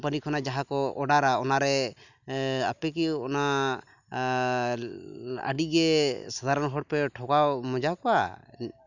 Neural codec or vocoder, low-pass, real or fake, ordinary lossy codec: none; 7.2 kHz; real; none